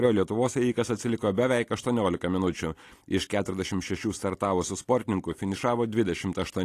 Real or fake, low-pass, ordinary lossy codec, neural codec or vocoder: real; 14.4 kHz; AAC, 48 kbps; none